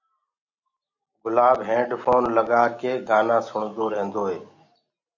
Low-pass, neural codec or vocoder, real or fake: 7.2 kHz; none; real